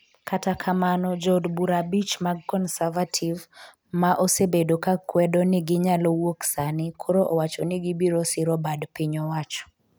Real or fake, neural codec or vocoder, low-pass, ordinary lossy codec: real; none; none; none